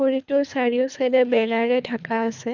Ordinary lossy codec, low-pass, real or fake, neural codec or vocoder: none; 7.2 kHz; fake; codec, 24 kHz, 3 kbps, HILCodec